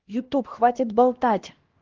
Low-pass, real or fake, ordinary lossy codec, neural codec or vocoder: 7.2 kHz; fake; Opus, 16 kbps; codec, 16 kHz, 1 kbps, X-Codec, HuBERT features, trained on LibriSpeech